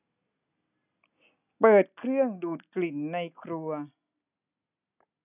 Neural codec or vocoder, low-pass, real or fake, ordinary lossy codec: none; 3.6 kHz; real; none